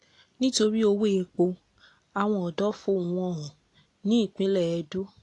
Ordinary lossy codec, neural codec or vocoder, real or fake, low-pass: AAC, 48 kbps; none; real; 10.8 kHz